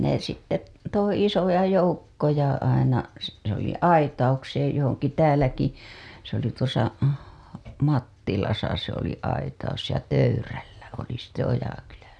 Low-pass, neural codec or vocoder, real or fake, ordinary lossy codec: none; none; real; none